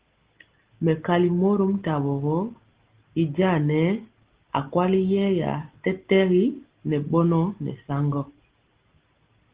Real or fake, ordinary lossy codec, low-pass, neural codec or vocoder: real; Opus, 16 kbps; 3.6 kHz; none